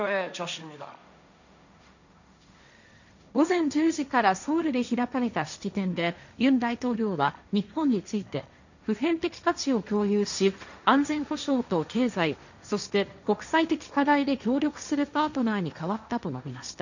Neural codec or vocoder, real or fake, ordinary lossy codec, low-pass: codec, 16 kHz, 1.1 kbps, Voila-Tokenizer; fake; none; 7.2 kHz